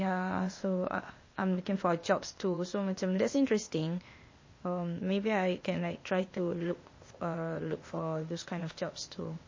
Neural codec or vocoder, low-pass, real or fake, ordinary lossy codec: codec, 16 kHz, 0.8 kbps, ZipCodec; 7.2 kHz; fake; MP3, 32 kbps